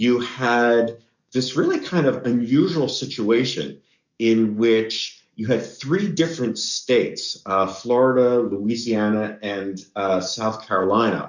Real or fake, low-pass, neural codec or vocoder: fake; 7.2 kHz; codec, 44.1 kHz, 7.8 kbps, DAC